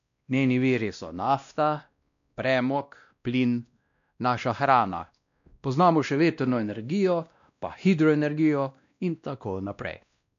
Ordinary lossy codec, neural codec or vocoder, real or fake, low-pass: MP3, 96 kbps; codec, 16 kHz, 1 kbps, X-Codec, WavLM features, trained on Multilingual LibriSpeech; fake; 7.2 kHz